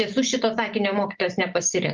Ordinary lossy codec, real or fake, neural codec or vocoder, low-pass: Opus, 32 kbps; real; none; 7.2 kHz